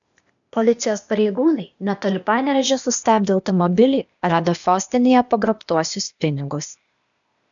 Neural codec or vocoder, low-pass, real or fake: codec, 16 kHz, 0.8 kbps, ZipCodec; 7.2 kHz; fake